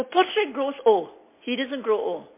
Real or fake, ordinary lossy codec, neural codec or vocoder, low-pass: real; MP3, 24 kbps; none; 3.6 kHz